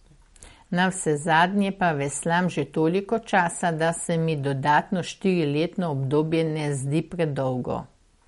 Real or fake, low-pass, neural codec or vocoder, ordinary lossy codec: real; 19.8 kHz; none; MP3, 48 kbps